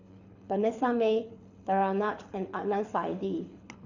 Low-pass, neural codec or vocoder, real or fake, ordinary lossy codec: 7.2 kHz; codec, 24 kHz, 6 kbps, HILCodec; fake; none